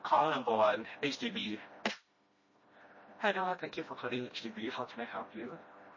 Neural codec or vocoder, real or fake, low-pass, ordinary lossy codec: codec, 16 kHz, 1 kbps, FreqCodec, smaller model; fake; 7.2 kHz; MP3, 32 kbps